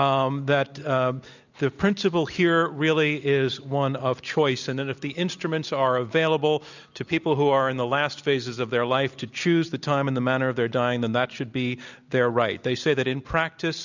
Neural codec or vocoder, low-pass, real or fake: none; 7.2 kHz; real